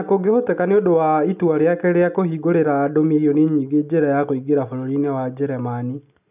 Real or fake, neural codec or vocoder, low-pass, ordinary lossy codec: real; none; 3.6 kHz; none